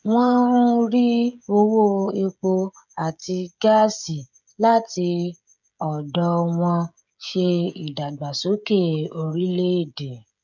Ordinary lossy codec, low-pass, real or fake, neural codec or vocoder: none; 7.2 kHz; fake; codec, 16 kHz, 16 kbps, FreqCodec, smaller model